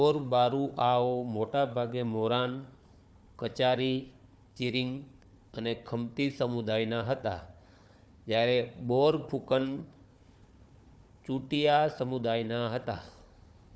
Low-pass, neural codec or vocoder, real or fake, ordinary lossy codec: none; codec, 16 kHz, 4 kbps, FunCodec, trained on Chinese and English, 50 frames a second; fake; none